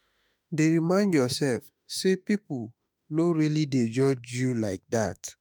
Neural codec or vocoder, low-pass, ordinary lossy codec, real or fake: autoencoder, 48 kHz, 32 numbers a frame, DAC-VAE, trained on Japanese speech; none; none; fake